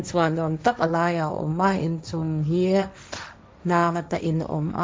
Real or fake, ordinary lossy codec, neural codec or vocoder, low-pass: fake; none; codec, 16 kHz, 1.1 kbps, Voila-Tokenizer; none